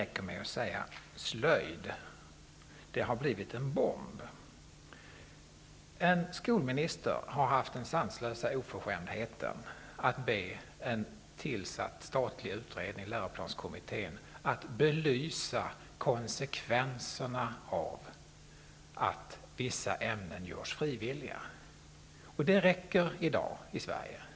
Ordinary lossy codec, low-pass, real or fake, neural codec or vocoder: none; none; real; none